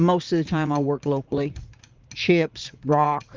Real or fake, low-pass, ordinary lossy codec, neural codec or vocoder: fake; 7.2 kHz; Opus, 32 kbps; vocoder, 44.1 kHz, 80 mel bands, Vocos